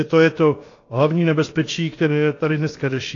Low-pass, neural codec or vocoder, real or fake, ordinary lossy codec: 7.2 kHz; codec, 16 kHz, about 1 kbps, DyCAST, with the encoder's durations; fake; AAC, 32 kbps